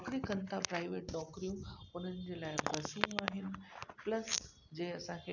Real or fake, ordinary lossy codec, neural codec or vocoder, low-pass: real; none; none; 7.2 kHz